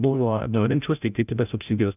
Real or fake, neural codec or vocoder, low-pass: fake; codec, 16 kHz, 0.5 kbps, FreqCodec, larger model; 3.6 kHz